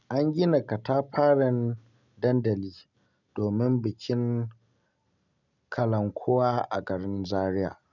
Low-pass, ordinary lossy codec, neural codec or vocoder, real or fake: 7.2 kHz; none; none; real